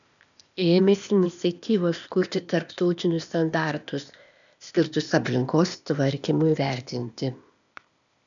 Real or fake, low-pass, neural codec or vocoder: fake; 7.2 kHz; codec, 16 kHz, 0.8 kbps, ZipCodec